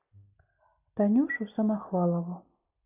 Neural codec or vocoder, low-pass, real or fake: none; 3.6 kHz; real